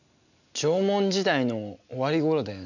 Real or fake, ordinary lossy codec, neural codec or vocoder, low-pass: real; none; none; 7.2 kHz